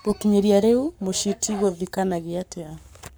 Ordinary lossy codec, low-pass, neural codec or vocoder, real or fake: none; none; codec, 44.1 kHz, 7.8 kbps, Pupu-Codec; fake